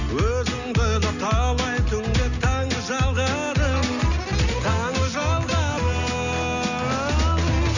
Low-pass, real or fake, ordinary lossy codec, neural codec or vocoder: 7.2 kHz; real; none; none